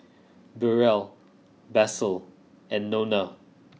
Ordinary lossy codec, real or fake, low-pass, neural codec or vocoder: none; real; none; none